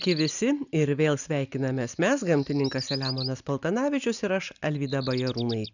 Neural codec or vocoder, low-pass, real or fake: none; 7.2 kHz; real